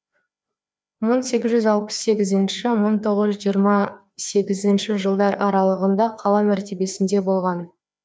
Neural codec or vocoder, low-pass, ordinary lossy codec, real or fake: codec, 16 kHz, 2 kbps, FreqCodec, larger model; none; none; fake